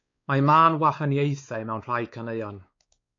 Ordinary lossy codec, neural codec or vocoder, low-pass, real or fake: AAC, 48 kbps; codec, 16 kHz, 4 kbps, X-Codec, WavLM features, trained on Multilingual LibriSpeech; 7.2 kHz; fake